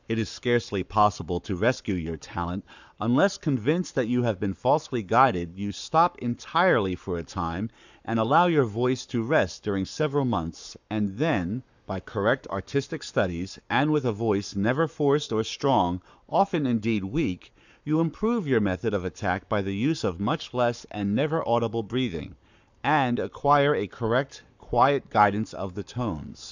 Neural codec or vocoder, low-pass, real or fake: codec, 44.1 kHz, 7.8 kbps, Pupu-Codec; 7.2 kHz; fake